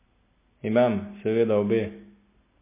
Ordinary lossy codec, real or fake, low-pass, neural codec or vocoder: MP3, 24 kbps; real; 3.6 kHz; none